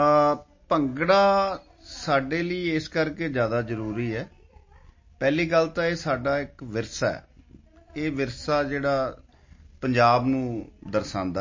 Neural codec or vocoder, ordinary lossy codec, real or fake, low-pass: none; MP3, 32 kbps; real; 7.2 kHz